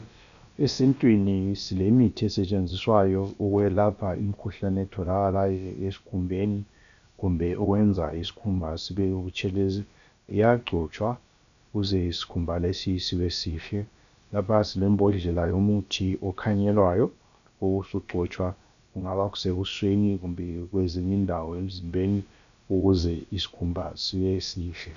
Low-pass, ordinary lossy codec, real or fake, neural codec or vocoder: 7.2 kHz; AAC, 96 kbps; fake; codec, 16 kHz, about 1 kbps, DyCAST, with the encoder's durations